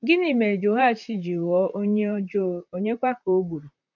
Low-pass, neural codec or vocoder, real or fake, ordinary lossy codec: 7.2 kHz; vocoder, 44.1 kHz, 128 mel bands, Pupu-Vocoder; fake; none